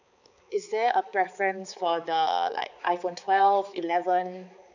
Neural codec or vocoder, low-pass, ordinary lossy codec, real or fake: codec, 16 kHz, 4 kbps, X-Codec, HuBERT features, trained on balanced general audio; 7.2 kHz; none; fake